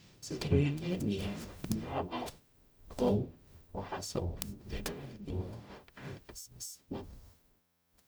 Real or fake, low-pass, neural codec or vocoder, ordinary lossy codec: fake; none; codec, 44.1 kHz, 0.9 kbps, DAC; none